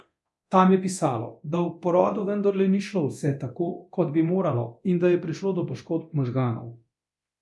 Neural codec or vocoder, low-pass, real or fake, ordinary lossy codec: codec, 24 kHz, 0.9 kbps, DualCodec; none; fake; none